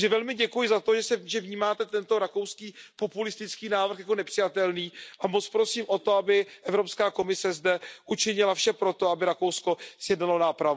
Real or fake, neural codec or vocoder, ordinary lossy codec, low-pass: real; none; none; none